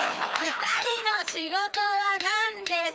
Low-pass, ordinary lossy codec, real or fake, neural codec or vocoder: none; none; fake; codec, 16 kHz, 2 kbps, FreqCodec, larger model